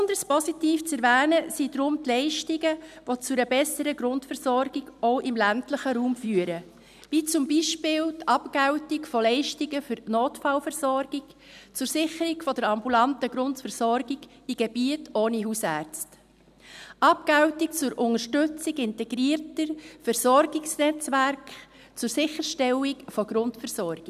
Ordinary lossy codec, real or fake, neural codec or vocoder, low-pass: none; real; none; 14.4 kHz